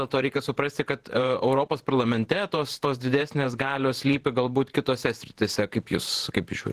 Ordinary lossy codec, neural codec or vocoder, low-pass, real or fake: Opus, 16 kbps; vocoder, 48 kHz, 128 mel bands, Vocos; 14.4 kHz; fake